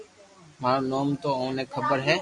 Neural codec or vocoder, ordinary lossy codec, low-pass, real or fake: none; Opus, 64 kbps; 10.8 kHz; real